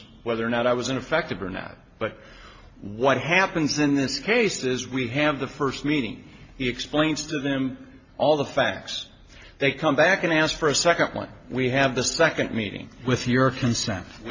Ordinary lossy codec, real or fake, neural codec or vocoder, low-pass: AAC, 48 kbps; real; none; 7.2 kHz